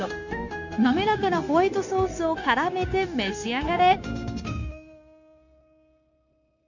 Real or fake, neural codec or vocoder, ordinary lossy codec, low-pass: fake; codec, 16 kHz, 0.9 kbps, LongCat-Audio-Codec; none; 7.2 kHz